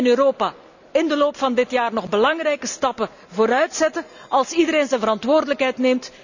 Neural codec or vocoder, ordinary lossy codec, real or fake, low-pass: none; none; real; 7.2 kHz